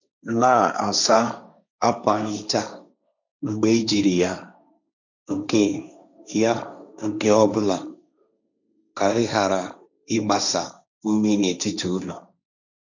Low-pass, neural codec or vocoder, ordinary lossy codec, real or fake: 7.2 kHz; codec, 16 kHz, 1.1 kbps, Voila-Tokenizer; none; fake